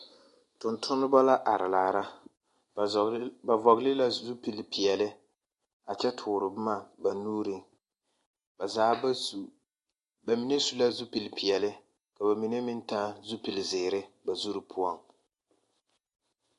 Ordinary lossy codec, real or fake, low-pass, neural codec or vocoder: AAC, 48 kbps; real; 10.8 kHz; none